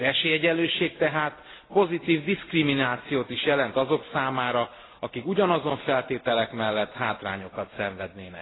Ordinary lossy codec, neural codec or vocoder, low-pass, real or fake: AAC, 16 kbps; none; 7.2 kHz; real